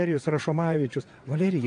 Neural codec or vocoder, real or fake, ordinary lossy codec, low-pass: vocoder, 22.05 kHz, 80 mel bands, WaveNeXt; fake; AAC, 48 kbps; 9.9 kHz